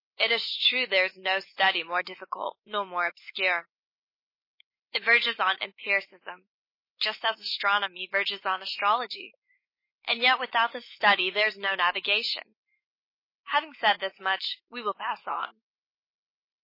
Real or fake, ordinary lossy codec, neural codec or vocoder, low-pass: fake; MP3, 24 kbps; autoencoder, 48 kHz, 128 numbers a frame, DAC-VAE, trained on Japanese speech; 5.4 kHz